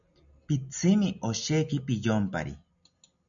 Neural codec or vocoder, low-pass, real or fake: none; 7.2 kHz; real